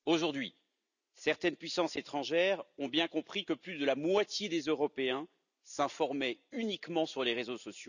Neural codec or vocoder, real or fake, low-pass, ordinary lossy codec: none; real; 7.2 kHz; none